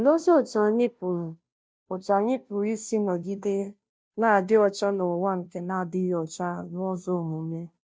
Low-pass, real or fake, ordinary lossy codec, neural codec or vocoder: none; fake; none; codec, 16 kHz, 0.5 kbps, FunCodec, trained on Chinese and English, 25 frames a second